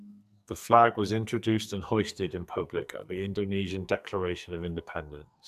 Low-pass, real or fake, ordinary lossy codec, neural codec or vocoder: 14.4 kHz; fake; none; codec, 44.1 kHz, 2.6 kbps, SNAC